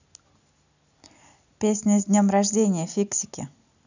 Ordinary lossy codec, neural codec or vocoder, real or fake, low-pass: none; none; real; 7.2 kHz